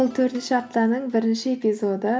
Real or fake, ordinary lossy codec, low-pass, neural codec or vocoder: real; none; none; none